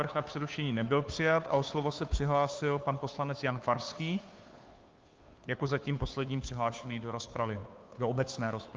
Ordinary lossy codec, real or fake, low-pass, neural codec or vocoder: Opus, 24 kbps; fake; 7.2 kHz; codec, 16 kHz, 2 kbps, FunCodec, trained on Chinese and English, 25 frames a second